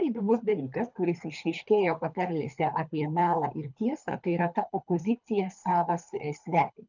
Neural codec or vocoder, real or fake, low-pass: codec, 24 kHz, 3 kbps, HILCodec; fake; 7.2 kHz